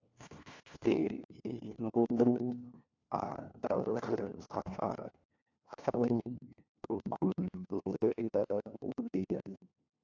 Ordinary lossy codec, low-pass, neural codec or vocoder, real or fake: AAC, 48 kbps; 7.2 kHz; codec, 16 kHz, 1 kbps, FunCodec, trained on LibriTTS, 50 frames a second; fake